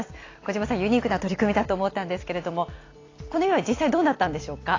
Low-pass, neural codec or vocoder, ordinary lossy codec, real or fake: 7.2 kHz; none; AAC, 32 kbps; real